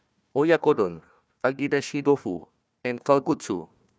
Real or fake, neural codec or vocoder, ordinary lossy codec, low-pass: fake; codec, 16 kHz, 1 kbps, FunCodec, trained on Chinese and English, 50 frames a second; none; none